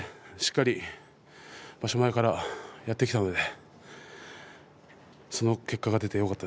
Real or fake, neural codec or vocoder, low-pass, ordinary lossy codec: real; none; none; none